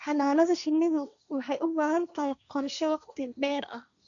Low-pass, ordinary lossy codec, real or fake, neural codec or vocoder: 7.2 kHz; none; fake; codec, 16 kHz, 1.1 kbps, Voila-Tokenizer